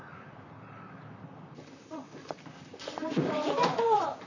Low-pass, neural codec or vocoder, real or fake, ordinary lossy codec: 7.2 kHz; vocoder, 44.1 kHz, 128 mel bands, Pupu-Vocoder; fake; none